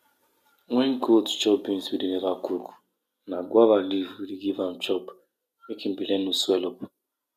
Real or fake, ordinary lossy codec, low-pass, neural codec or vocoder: real; none; 14.4 kHz; none